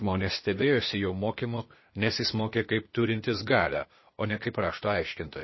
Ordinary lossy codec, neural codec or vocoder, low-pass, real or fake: MP3, 24 kbps; codec, 16 kHz, 0.8 kbps, ZipCodec; 7.2 kHz; fake